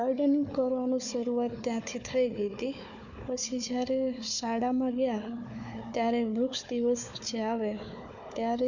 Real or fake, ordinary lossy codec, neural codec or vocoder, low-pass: fake; none; codec, 16 kHz, 4 kbps, FunCodec, trained on Chinese and English, 50 frames a second; 7.2 kHz